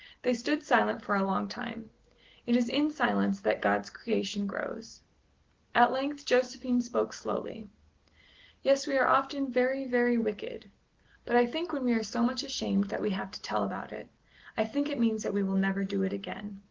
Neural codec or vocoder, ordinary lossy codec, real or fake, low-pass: none; Opus, 16 kbps; real; 7.2 kHz